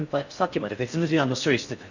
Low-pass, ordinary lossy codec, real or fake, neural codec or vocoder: 7.2 kHz; none; fake; codec, 16 kHz in and 24 kHz out, 0.6 kbps, FocalCodec, streaming, 4096 codes